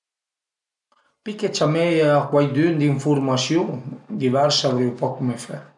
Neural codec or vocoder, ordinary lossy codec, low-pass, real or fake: none; none; 10.8 kHz; real